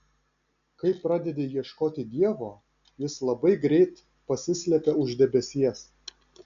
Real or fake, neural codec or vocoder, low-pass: real; none; 7.2 kHz